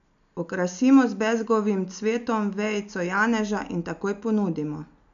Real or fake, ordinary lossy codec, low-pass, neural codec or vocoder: real; none; 7.2 kHz; none